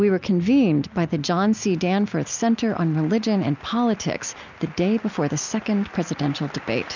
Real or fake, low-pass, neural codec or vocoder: real; 7.2 kHz; none